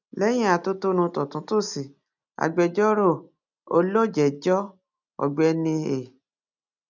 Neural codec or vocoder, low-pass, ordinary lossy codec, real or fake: none; 7.2 kHz; none; real